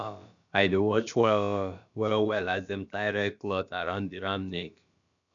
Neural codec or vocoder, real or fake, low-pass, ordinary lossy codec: codec, 16 kHz, about 1 kbps, DyCAST, with the encoder's durations; fake; 7.2 kHz; none